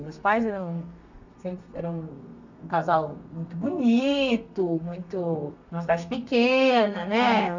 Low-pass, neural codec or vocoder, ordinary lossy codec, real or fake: 7.2 kHz; codec, 32 kHz, 1.9 kbps, SNAC; none; fake